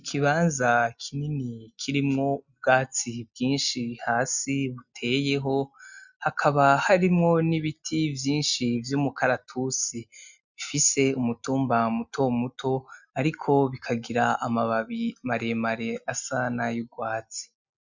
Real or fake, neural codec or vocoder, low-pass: real; none; 7.2 kHz